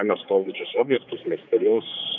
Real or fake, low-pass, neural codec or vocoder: fake; 7.2 kHz; codec, 16 kHz, 4 kbps, X-Codec, HuBERT features, trained on general audio